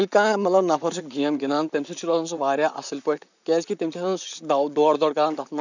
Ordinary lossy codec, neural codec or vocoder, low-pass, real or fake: none; vocoder, 44.1 kHz, 128 mel bands, Pupu-Vocoder; 7.2 kHz; fake